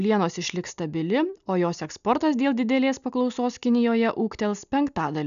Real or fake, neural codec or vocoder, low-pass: real; none; 7.2 kHz